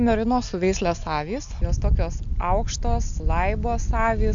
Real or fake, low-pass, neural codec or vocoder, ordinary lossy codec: real; 7.2 kHz; none; MP3, 64 kbps